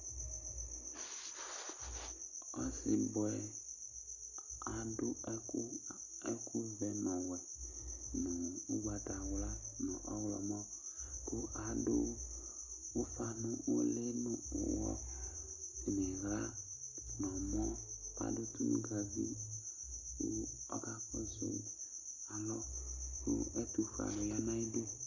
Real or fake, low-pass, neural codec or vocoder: real; 7.2 kHz; none